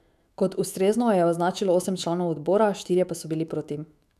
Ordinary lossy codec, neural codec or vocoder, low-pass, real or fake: none; autoencoder, 48 kHz, 128 numbers a frame, DAC-VAE, trained on Japanese speech; 14.4 kHz; fake